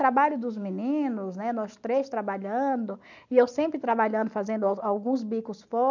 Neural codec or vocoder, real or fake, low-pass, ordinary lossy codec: none; real; 7.2 kHz; none